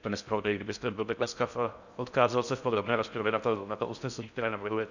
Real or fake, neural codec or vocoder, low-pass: fake; codec, 16 kHz in and 24 kHz out, 0.6 kbps, FocalCodec, streaming, 4096 codes; 7.2 kHz